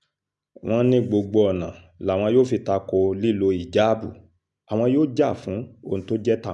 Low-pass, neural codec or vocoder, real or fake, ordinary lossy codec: 10.8 kHz; none; real; none